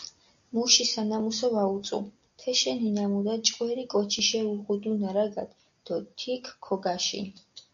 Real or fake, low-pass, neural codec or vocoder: real; 7.2 kHz; none